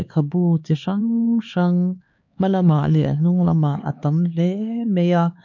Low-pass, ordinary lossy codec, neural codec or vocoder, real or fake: 7.2 kHz; MP3, 48 kbps; codec, 16 kHz, 4 kbps, X-Codec, HuBERT features, trained on LibriSpeech; fake